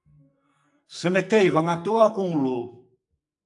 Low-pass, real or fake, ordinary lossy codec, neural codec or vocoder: 10.8 kHz; fake; AAC, 64 kbps; codec, 44.1 kHz, 2.6 kbps, SNAC